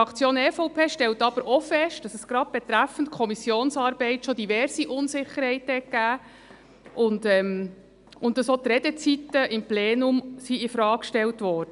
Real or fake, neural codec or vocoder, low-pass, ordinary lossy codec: real; none; 10.8 kHz; none